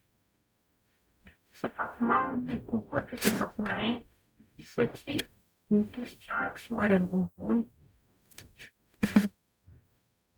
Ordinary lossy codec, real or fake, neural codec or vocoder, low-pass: none; fake; codec, 44.1 kHz, 0.9 kbps, DAC; none